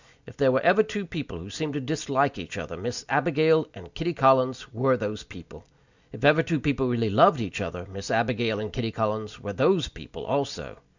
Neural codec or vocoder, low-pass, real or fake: none; 7.2 kHz; real